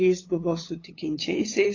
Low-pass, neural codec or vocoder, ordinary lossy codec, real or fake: 7.2 kHz; codec, 16 kHz, 8 kbps, FunCodec, trained on LibriTTS, 25 frames a second; AAC, 32 kbps; fake